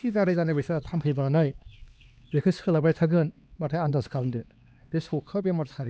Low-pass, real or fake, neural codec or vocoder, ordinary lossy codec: none; fake; codec, 16 kHz, 2 kbps, X-Codec, HuBERT features, trained on LibriSpeech; none